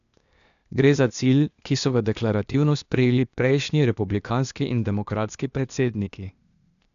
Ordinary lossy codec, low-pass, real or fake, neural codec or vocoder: none; 7.2 kHz; fake; codec, 16 kHz, 0.8 kbps, ZipCodec